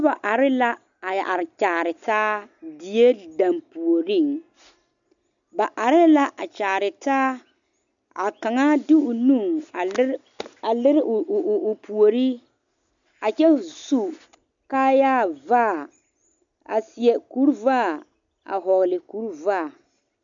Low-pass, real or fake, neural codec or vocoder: 7.2 kHz; real; none